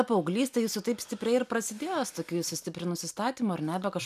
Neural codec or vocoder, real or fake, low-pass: none; real; 14.4 kHz